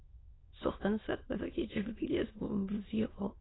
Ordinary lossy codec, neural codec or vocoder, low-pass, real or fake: AAC, 16 kbps; autoencoder, 22.05 kHz, a latent of 192 numbers a frame, VITS, trained on many speakers; 7.2 kHz; fake